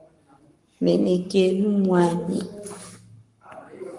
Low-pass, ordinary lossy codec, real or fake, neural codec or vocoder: 10.8 kHz; Opus, 24 kbps; fake; codec, 44.1 kHz, 7.8 kbps, Pupu-Codec